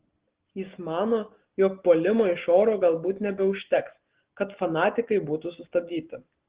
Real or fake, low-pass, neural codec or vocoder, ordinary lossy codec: real; 3.6 kHz; none; Opus, 16 kbps